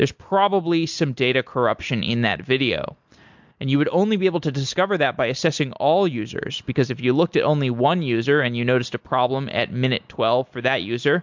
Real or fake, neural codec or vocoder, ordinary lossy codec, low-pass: real; none; MP3, 64 kbps; 7.2 kHz